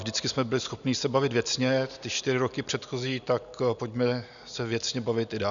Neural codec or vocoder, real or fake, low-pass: none; real; 7.2 kHz